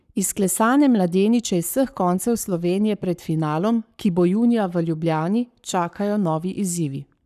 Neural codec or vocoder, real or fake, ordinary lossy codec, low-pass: codec, 44.1 kHz, 7.8 kbps, Pupu-Codec; fake; none; 14.4 kHz